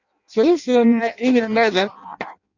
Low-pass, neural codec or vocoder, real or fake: 7.2 kHz; codec, 16 kHz in and 24 kHz out, 0.6 kbps, FireRedTTS-2 codec; fake